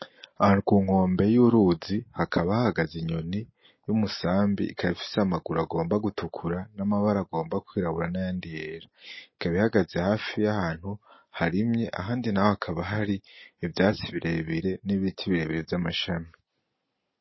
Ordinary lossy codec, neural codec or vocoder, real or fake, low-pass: MP3, 24 kbps; none; real; 7.2 kHz